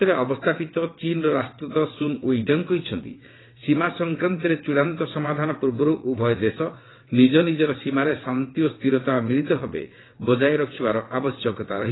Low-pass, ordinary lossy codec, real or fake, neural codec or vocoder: 7.2 kHz; AAC, 16 kbps; fake; vocoder, 22.05 kHz, 80 mel bands, WaveNeXt